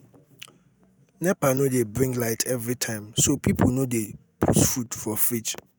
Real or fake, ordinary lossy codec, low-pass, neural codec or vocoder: real; none; none; none